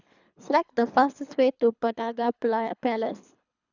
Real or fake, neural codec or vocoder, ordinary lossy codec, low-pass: fake; codec, 24 kHz, 3 kbps, HILCodec; none; 7.2 kHz